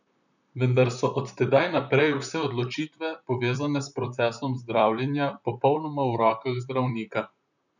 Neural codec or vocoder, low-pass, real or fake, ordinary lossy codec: vocoder, 44.1 kHz, 128 mel bands, Pupu-Vocoder; 7.2 kHz; fake; none